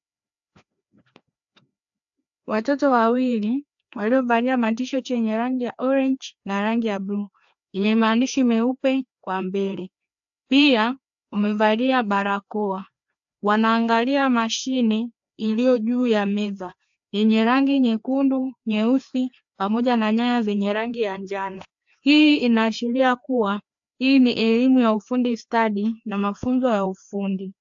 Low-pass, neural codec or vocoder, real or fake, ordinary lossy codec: 7.2 kHz; codec, 16 kHz, 2 kbps, FreqCodec, larger model; fake; AAC, 64 kbps